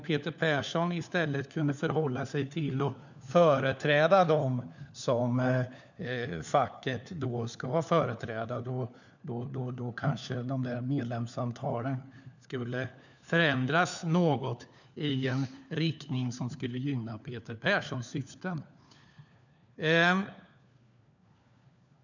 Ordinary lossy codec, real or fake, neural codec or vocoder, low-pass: none; fake; codec, 16 kHz, 4 kbps, FunCodec, trained on LibriTTS, 50 frames a second; 7.2 kHz